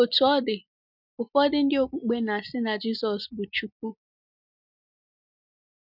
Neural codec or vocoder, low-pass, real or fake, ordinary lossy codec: none; 5.4 kHz; real; none